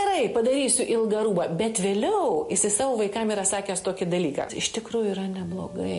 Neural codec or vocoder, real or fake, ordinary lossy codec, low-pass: none; real; MP3, 48 kbps; 10.8 kHz